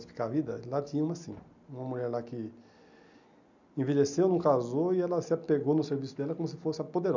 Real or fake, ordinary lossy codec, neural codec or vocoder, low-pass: real; none; none; 7.2 kHz